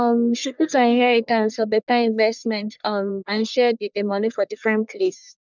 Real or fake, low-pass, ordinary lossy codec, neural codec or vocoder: fake; 7.2 kHz; none; codec, 44.1 kHz, 1.7 kbps, Pupu-Codec